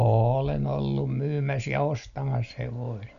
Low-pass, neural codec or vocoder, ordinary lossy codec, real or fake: 7.2 kHz; none; none; real